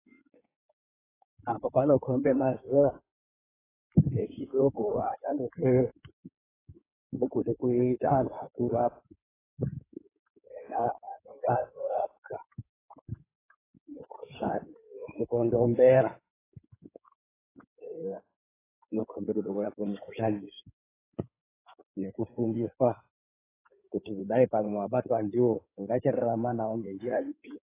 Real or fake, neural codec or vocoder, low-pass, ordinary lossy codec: fake; codec, 16 kHz in and 24 kHz out, 2.2 kbps, FireRedTTS-2 codec; 3.6 kHz; AAC, 16 kbps